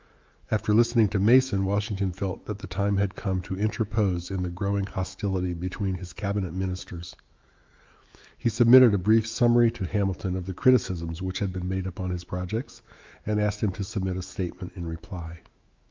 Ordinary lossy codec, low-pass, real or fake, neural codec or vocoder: Opus, 24 kbps; 7.2 kHz; fake; autoencoder, 48 kHz, 128 numbers a frame, DAC-VAE, trained on Japanese speech